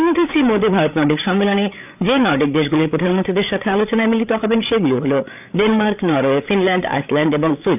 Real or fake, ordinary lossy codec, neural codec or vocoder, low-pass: fake; none; codec, 16 kHz, 8 kbps, FreqCodec, larger model; 3.6 kHz